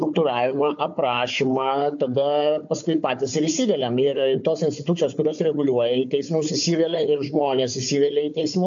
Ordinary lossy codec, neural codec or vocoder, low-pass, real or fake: AAC, 64 kbps; codec, 16 kHz, 4 kbps, FunCodec, trained on Chinese and English, 50 frames a second; 7.2 kHz; fake